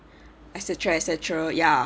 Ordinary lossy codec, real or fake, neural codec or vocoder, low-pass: none; real; none; none